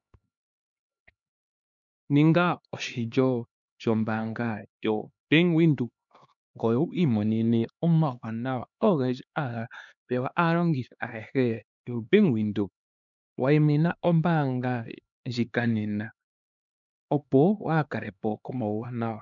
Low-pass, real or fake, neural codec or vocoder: 7.2 kHz; fake; codec, 16 kHz, 2 kbps, X-Codec, HuBERT features, trained on LibriSpeech